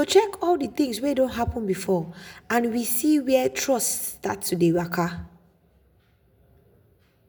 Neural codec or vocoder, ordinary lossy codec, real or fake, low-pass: none; none; real; none